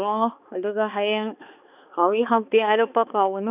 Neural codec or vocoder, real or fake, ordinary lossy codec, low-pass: codec, 16 kHz, 2 kbps, X-Codec, HuBERT features, trained on balanced general audio; fake; none; 3.6 kHz